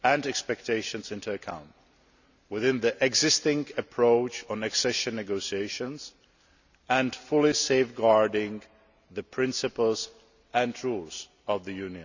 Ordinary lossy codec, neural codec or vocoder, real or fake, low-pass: none; none; real; 7.2 kHz